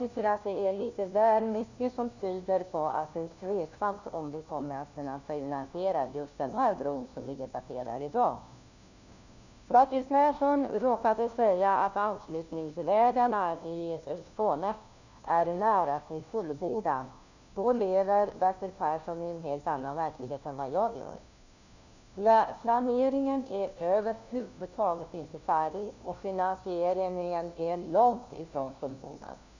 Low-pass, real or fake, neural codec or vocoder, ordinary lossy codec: 7.2 kHz; fake; codec, 16 kHz, 1 kbps, FunCodec, trained on LibriTTS, 50 frames a second; none